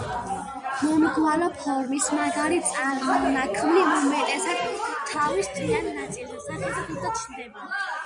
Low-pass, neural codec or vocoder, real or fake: 9.9 kHz; none; real